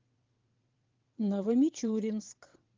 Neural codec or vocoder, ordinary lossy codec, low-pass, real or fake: vocoder, 22.05 kHz, 80 mel bands, WaveNeXt; Opus, 32 kbps; 7.2 kHz; fake